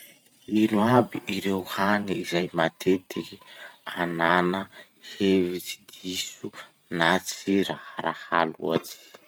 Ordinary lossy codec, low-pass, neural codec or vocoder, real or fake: none; none; vocoder, 44.1 kHz, 128 mel bands every 512 samples, BigVGAN v2; fake